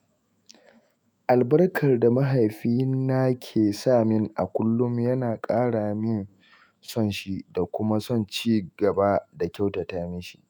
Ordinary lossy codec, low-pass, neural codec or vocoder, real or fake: none; none; autoencoder, 48 kHz, 128 numbers a frame, DAC-VAE, trained on Japanese speech; fake